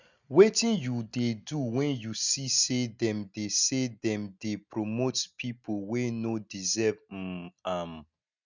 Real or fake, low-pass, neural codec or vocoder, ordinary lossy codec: real; 7.2 kHz; none; none